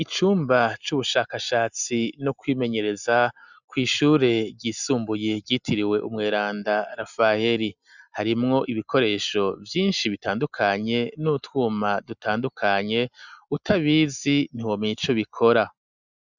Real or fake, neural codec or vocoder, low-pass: real; none; 7.2 kHz